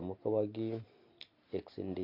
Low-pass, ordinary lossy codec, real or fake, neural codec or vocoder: 5.4 kHz; none; real; none